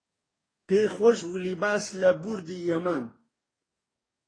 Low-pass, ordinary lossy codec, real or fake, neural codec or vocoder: 9.9 kHz; AAC, 32 kbps; fake; codec, 44.1 kHz, 2.6 kbps, DAC